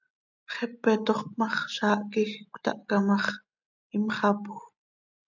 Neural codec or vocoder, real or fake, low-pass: none; real; 7.2 kHz